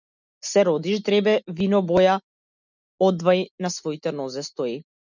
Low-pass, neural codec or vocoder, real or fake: 7.2 kHz; none; real